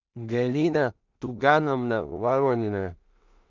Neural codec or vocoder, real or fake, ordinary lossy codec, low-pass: codec, 16 kHz in and 24 kHz out, 0.4 kbps, LongCat-Audio-Codec, two codebook decoder; fake; Opus, 64 kbps; 7.2 kHz